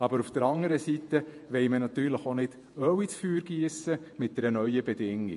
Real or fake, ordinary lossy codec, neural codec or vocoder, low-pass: fake; MP3, 48 kbps; vocoder, 48 kHz, 128 mel bands, Vocos; 14.4 kHz